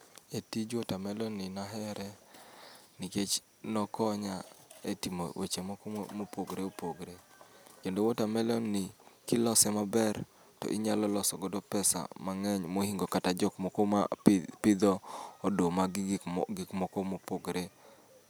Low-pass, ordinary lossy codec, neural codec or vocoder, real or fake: none; none; none; real